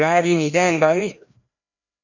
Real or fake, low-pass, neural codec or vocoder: fake; 7.2 kHz; codec, 16 kHz, 1 kbps, FreqCodec, larger model